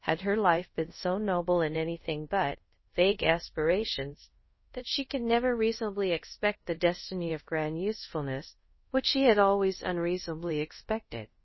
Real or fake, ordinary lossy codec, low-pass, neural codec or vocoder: fake; MP3, 24 kbps; 7.2 kHz; codec, 24 kHz, 0.5 kbps, DualCodec